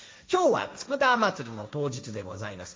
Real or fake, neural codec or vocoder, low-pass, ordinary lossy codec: fake; codec, 16 kHz, 1.1 kbps, Voila-Tokenizer; none; none